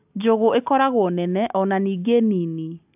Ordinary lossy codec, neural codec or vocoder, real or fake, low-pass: AAC, 32 kbps; none; real; 3.6 kHz